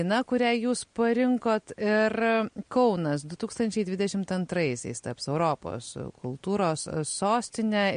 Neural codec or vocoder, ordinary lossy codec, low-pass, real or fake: none; MP3, 48 kbps; 9.9 kHz; real